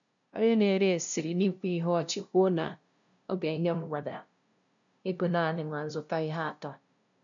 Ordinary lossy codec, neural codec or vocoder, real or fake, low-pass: MP3, 96 kbps; codec, 16 kHz, 0.5 kbps, FunCodec, trained on LibriTTS, 25 frames a second; fake; 7.2 kHz